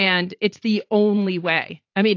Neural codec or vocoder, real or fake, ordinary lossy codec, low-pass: vocoder, 44.1 kHz, 80 mel bands, Vocos; fake; AAC, 48 kbps; 7.2 kHz